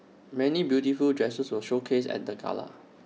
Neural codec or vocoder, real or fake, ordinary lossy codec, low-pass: none; real; none; none